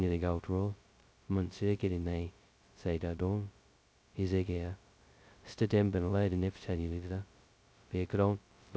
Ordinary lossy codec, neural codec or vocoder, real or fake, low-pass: none; codec, 16 kHz, 0.2 kbps, FocalCodec; fake; none